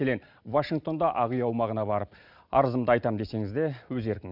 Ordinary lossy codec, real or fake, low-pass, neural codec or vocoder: none; real; 5.4 kHz; none